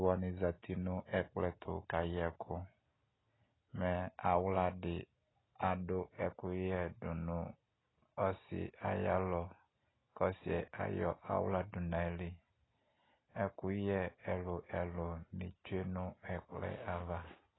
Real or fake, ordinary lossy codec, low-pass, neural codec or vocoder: real; AAC, 16 kbps; 7.2 kHz; none